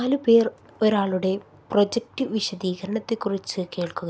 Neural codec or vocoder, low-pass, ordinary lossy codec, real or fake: none; none; none; real